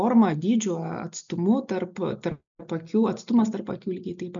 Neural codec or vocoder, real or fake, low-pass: none; real; 7.2 kHz